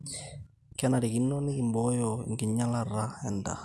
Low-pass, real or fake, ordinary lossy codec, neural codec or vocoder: none; real; none; none